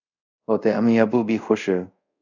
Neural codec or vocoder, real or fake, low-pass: codec, 24 kHz, 0.5 kbps, DualCodec; fake; 7.2 kHz